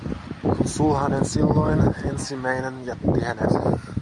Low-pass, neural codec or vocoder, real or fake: 10.8 kHz; none; real